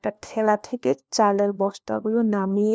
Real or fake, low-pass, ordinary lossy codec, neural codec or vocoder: fake; none; none; codec, 16 kHz, 1 kbps, FunCodec, trained on LibriTTS, 50 frames a second